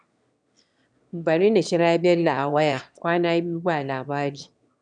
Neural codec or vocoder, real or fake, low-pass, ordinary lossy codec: autoencoder, 22.05 kHz, a latent of 192 numbers a frame, VITS, trained on one speaker; fake; 9.9 kHz; none